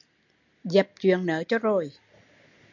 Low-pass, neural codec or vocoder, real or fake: 7.2 kHz; none; real